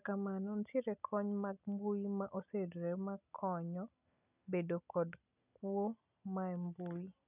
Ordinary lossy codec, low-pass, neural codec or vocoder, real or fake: none; 3.6 kHz; none; real